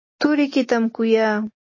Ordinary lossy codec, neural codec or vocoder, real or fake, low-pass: MP3, 32 kbps; none; real; 7.2 kHz